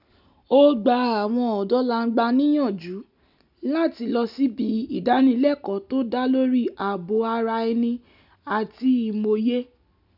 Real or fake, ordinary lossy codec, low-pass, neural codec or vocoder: real; none; 5.4 kHz; none